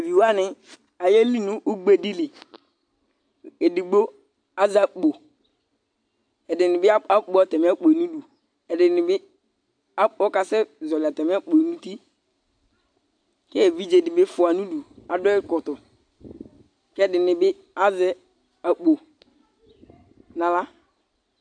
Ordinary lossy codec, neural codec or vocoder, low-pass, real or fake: AAC, 64 kbps; none; 9.9 kHz; real